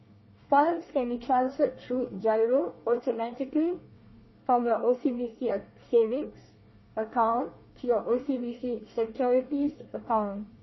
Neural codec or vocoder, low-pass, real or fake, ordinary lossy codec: codec, 24 kHz, 1 kbps, SNAC; 7.2 kHz; fake; MP3, 24 kbps